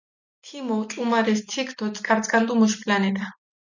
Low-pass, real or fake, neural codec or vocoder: 7.2 kHz; real; none